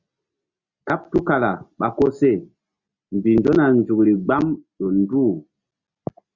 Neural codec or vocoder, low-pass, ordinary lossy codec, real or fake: none; 7.2 kHz; Opus, 64 kbps; real